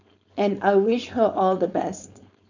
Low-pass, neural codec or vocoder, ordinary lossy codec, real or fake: 7.2 kHz; codec, 16 kHz, 4.8 kbps, FACodec; none; fake